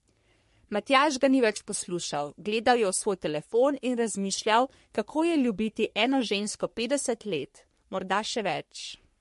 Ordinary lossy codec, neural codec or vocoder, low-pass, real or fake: MP3, 48 kbps; codec, 44.1 kHz, 3.4 kbps, Pupu-Codec; 14.4 kHz; fake